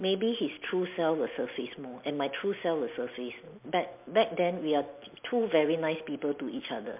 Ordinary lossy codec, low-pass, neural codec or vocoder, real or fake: MP3, 32 kbps; 3.6 kHz; none; real